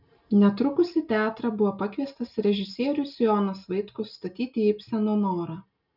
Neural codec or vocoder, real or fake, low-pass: none; real; 5.4 kHz